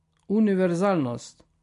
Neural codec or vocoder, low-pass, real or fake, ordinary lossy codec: none; 10.8 kHz; real; MP3, 48 kbps